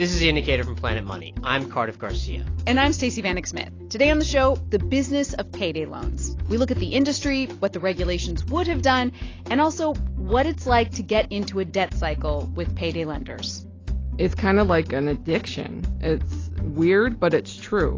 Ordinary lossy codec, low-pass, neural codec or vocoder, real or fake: AAC, 32 kbps; 7.2 kHz; none; real